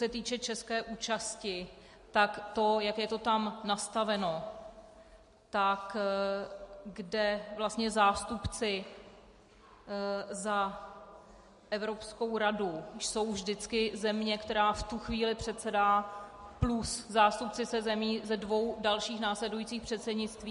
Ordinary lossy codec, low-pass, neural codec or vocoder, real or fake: MP3, 48 kbps; 10.8 kHz; none; real